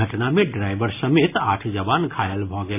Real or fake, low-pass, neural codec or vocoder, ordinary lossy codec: real; 3.6 kHz; none; AAC, 24 kbps